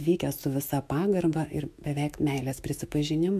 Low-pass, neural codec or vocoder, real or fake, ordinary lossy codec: 14.4 kHz; autoencoder, 48 kHz, 128 numbers a frame, DAC-VAE, trained on Japanese speech; fake; AAC, 96 kbps